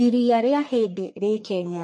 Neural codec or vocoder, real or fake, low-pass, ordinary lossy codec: codec, 44.1 kHz, 1.7 kbps, Pupu-Codec; fake; 10.8 kHz; MP3, 48 kbps